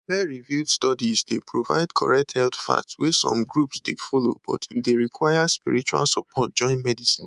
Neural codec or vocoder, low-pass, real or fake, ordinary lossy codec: codec, 24 kHz, 3.1 kbps, DualCodec; 10.8 kHz; fake; none